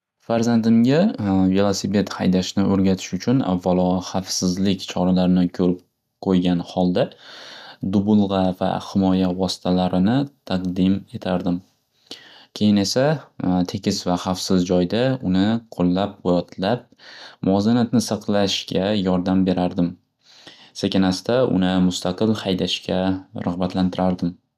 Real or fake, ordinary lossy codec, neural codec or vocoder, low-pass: real; none; none; 14.4 kHz